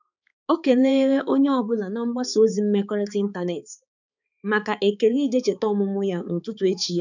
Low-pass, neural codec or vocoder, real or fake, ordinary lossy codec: 7.2 kHz; codec, 16 kHz, 4 kbps, X-Codec, HuBERT features, trained on balanced general audio; fake; none